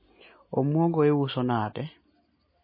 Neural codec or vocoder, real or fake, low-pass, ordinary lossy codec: none; real; 5.4 kHz; MP3, 24 kbps